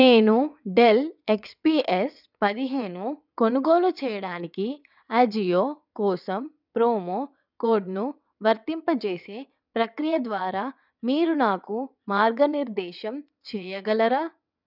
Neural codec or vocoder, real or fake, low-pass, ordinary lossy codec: vocoder, 22.05 kHz, 80 mel bands, WaveNeXt; fake; 5.4 kHz; none